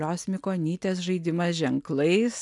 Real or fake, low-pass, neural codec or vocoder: real; 10.8 kHz; none